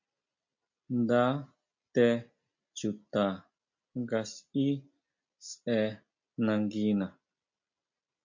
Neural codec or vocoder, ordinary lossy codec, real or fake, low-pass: none; MP3, 48 kbps; real; 7.2 kHz